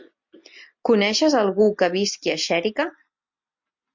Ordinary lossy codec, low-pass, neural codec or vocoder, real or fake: MP3, 48 kbps; 7.2 kHz; none; real